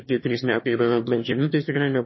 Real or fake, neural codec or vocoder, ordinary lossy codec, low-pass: fake; autoencoder, 22.05 kHz, a latent of 192 numbers a frame, VITS, trained on one speaker; MP3, 24 kbps; 7.2 kHz